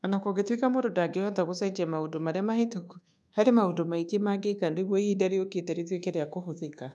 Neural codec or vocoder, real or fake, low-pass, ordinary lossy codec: codec, 24 kHz, 1.2 kbps, DualCodec; fake; none; none